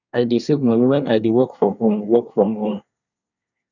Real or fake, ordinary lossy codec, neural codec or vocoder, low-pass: fake; none; codec, 24 kHz, 1 kbps, SNAC; 7.2 kHz